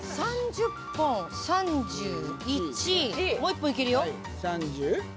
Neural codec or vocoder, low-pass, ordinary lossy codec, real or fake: none; none; none; real